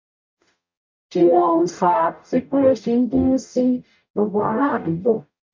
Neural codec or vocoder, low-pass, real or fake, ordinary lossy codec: codec, 44.1 kHz, 0.9 kbps, DAC; 7.2 kHz; fake; MP3, 64 kbps